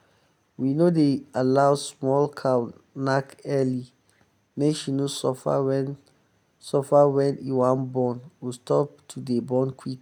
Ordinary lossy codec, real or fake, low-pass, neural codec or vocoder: none; real; 19.8 kHz; none